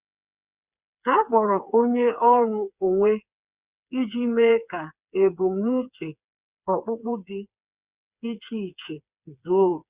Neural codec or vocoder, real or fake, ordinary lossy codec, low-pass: codec, 16 kHz, 4 kbps, FreqCodec, smaller model; fake; Opus, 64 kbps; 3.6 kHz